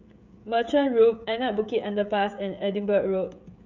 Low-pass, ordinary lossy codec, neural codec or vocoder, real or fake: 7.2 kHz; none; codec, 16 kHz, 16 kbps, FreqCodec, smaller model; fake